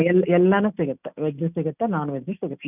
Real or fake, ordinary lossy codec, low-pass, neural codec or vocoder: real; none; 3.6 kHz; none